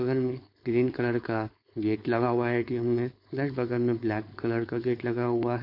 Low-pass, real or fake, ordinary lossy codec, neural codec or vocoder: 5.4 kHz; fake; MP3, 32 kbps; codec, 16 kHz, 4.8 kbps, FACodec